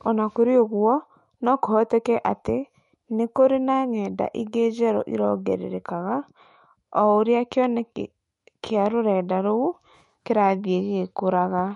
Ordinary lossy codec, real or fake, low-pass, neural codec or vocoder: MP3, 48 kbps; fake; 19.8 kHz; autoencoder, 48 kHz, 128 numbers a frame, DAC-VAE, trained on Japanese speech